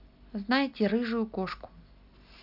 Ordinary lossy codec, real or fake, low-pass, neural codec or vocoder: none; real; 5.4 kHz; none